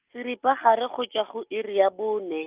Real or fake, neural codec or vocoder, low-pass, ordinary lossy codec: real; none; 3.6 kHz; Opus, 24 kbps